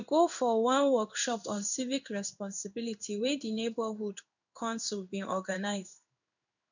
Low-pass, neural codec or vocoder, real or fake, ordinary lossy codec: 7.2 kHz; codec, 16 kHz in and 24 kHz out, 1 kbps, XY-Tokenizer; fake; none